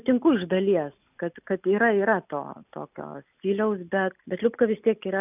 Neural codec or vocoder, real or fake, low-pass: none; real; 3.6 kHz